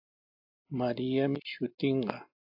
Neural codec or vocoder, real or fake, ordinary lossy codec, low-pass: none; real; AAC, 48 kbps; 5.4 kHz